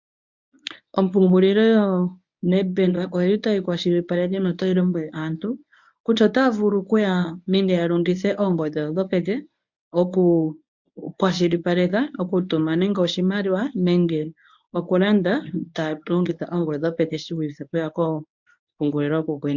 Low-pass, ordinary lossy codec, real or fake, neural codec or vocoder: 7.2 kHz; MP3, 64 kbps; fake; codec, 24 kHz, 0.9 kbps, WavTokenizer, medium speech release version 1